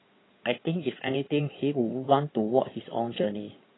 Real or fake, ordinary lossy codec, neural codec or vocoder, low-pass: fake; AAC, 16 kbps; codec, 16 kHz in and 24 kHz out, 2.2 kbps, FireRedTTS-2 codec; 7.2 kHz